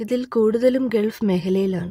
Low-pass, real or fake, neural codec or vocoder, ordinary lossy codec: 19.8 kHz; real; none; AAC, 48 kbps